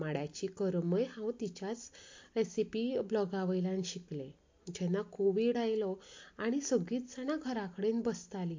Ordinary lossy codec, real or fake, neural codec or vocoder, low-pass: MP3, 48 kbps; real; none; 7.2 kHz